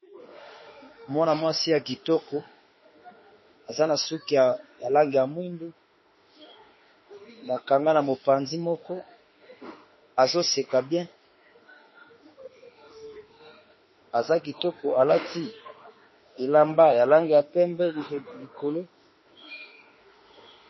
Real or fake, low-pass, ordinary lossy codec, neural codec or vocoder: fake; 7.2 kHz; MP3, 24 kbps; autoencoder, 48 kHz, 32 numbers a frame, DAC-VAE, trained on Japanese speech